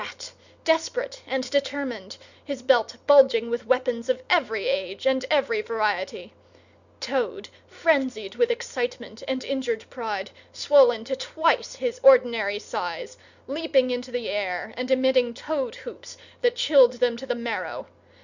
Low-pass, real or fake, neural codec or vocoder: 7.2 kHz; real; none